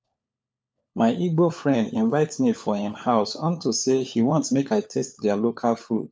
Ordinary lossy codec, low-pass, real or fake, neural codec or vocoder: none; none; fake; codec, 16 kHz, 4 kbps, FunCodec, trained on LibriTTS, 50 frames a second